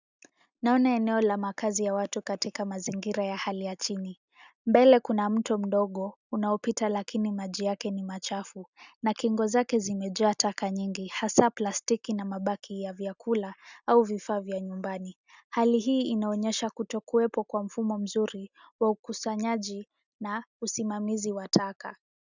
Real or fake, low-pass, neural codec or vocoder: real; 7.2 kHz; none